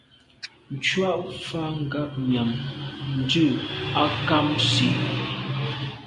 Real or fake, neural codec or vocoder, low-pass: real; none; 10.8 kHz